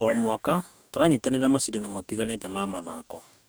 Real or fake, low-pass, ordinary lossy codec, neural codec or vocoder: fake; none; none; codec, 44.1 kHz, 2.6 kbps, DAC